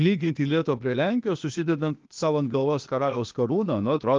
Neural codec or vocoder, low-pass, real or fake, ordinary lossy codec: codec, 16 kHz, 0.8 kbps, ZipCodec; 7.2 kHz; fake; Opus, 32 kbps